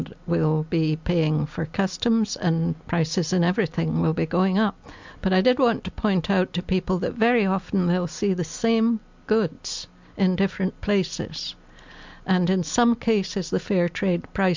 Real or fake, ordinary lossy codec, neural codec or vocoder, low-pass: real; MP3, 64 kbps; none; 7.2 kHz